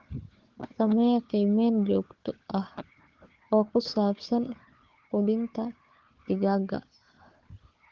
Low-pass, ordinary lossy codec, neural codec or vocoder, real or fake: 7.2 kHz; Opus, 16 kbps; codec, 16 kHz, 4 kbps, FunCodec, trained on Chinese and English, 50 frames a second; fake